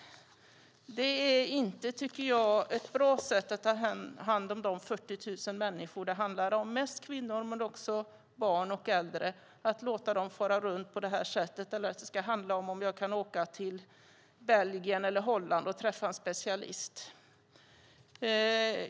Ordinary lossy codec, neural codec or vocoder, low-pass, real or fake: none; none; none; real